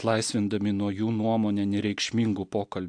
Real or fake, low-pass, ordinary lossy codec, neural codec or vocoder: real; 9.9 kHz; AAC, 64 kbps; none